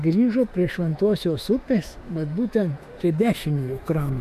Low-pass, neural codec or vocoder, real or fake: 14.4 kHz; autoencoder, 48 kHz, 32 numbers a frame, DAC-VAE, trained on Japanese speech; fake